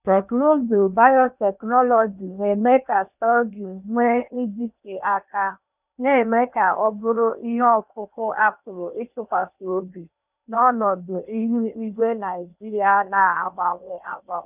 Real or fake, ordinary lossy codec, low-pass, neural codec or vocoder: fake; none; 3.6 kHz; codec, 16 kHz in and 24 kHz out, 0.8 kbps, FocalCodec, streaming, 65536 codes